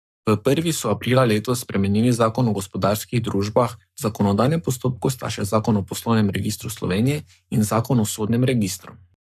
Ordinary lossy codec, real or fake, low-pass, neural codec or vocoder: none; fake; 14.4 kHz; codec, 44.1 kHz, 7.8 kbps, Pupu-Codec